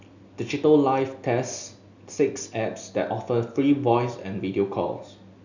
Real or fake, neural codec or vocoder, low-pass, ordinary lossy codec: real; none; 7.2 kHz; none